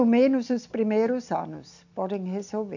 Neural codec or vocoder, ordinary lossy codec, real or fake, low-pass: none; none; real; 7.2 kHz